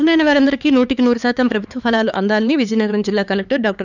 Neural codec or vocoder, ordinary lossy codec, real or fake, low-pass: codec, 16 kHz, 4 kbps, X-Codec, HuBERT features, trained on LibriSpeech; none; fake; 7.2 kHz